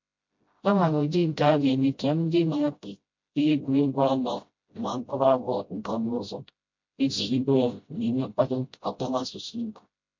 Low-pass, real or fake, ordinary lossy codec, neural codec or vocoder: 7.2 kHz; fake; MP3, 48 kbps; codec, 16 kHz, 0.5 kbps, FreqCodec, smaller model